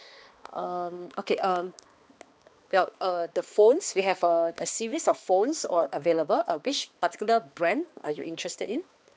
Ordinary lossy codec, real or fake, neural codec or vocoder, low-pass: none; fake; codec, 16 kHz, 2 kbps, X-Codec, HuBERT features, trained on balanced general audio; none